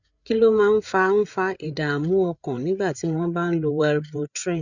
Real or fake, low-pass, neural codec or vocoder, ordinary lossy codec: fake; 7.2 kHz; codec, 16 kHz, 8 kbps, FreqCodec, larger model; none